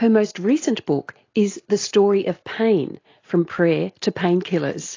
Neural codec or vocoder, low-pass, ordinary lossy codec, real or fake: none; 7.2 kHz; AAC, 32 kbps; real